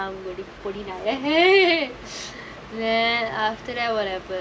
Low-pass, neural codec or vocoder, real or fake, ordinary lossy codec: none; none; real; none